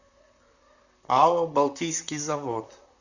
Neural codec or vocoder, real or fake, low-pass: vocoder, 44.1 kHz, 128 mel bands, Pupu-Vocoder; fake; 7.2 kHz